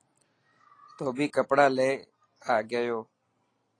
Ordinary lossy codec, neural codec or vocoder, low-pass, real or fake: AAC, 32 kbps; none; 9.9 kHz; real